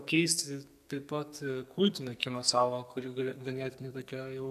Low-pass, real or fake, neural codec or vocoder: 14.4 kHz; fake; codec, 44.1 kHz, 2.6 kbps, SNAC